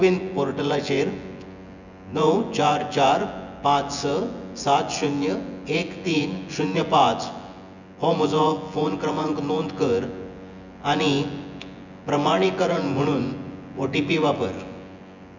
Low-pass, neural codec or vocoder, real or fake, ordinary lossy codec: 7.2 kHz; vocoder, 24 kHz, 100 mel bands, Vocos; fake; none